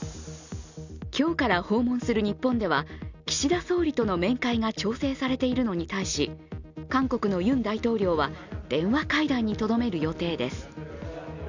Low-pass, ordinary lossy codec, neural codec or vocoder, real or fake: 7.2 kHz; none; none; real